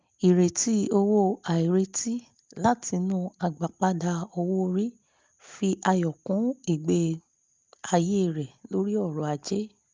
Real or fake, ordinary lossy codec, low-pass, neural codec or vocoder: real; Opus, 24 kbps; 7.2 kHz; none